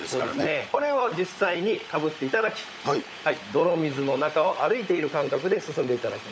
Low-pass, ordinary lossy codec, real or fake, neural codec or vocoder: none; none; fake; codec, 16 kHz, 16 kbps, FunCodec, trained on LibriTTS, 50 frames a second